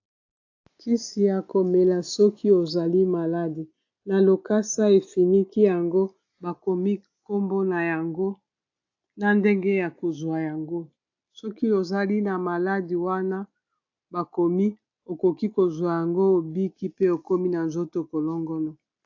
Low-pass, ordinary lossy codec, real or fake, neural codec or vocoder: 7.2 kHz; AAC, 48 kbps; real; none